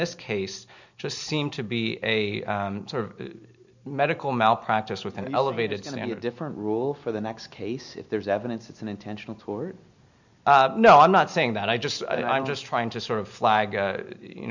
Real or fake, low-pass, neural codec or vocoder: real; 7.2 kHz; none